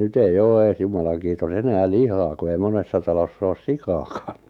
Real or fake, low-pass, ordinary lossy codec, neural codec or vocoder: fake; 19.8 kHz; none; autoencoder, 48 kHz, 128 numbers a frame, DAC-VAE, trained on Japanese speech